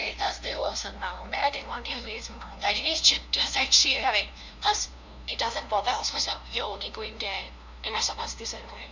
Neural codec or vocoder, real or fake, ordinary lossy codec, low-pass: codec, 16 kHz, 0.5 kbps, FunCodec, trained on LibriTTS, 25 frames a second; fake; none; 7.2 kHz